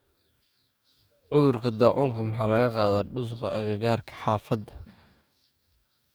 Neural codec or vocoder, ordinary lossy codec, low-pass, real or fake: codec, 44.1 kHz, 2.6 kbps, DAC; none; none; fake